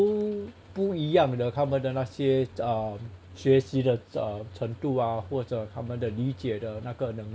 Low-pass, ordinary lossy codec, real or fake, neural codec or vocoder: none; none; real; none